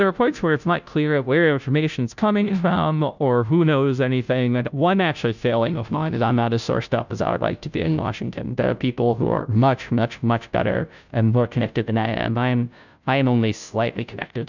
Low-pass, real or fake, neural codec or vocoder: 7.2 kHz; fake; codec, 16 kHz, 0.5 kbps, FunCodec, trained on Chinese and English, 25 frames a second